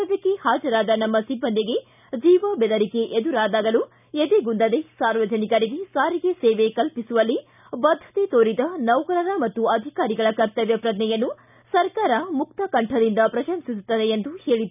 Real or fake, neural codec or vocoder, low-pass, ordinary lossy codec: real; none; 3.6 kHz; none